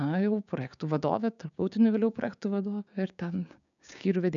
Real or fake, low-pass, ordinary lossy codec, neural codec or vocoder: fake; 7.2 kHz; MP3, 96 kbps; codec, 16 kHz, 6 kbps, DAC